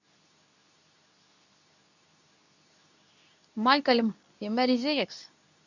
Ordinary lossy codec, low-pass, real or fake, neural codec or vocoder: none; 7.2 kHz; fake; codec, 24 kHz, 0.9 kbps, WavTokenizer, medium speech release version 2